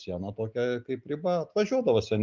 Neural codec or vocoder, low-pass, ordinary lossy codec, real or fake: none; 7.2 kHz; Opus, 24 kbps; real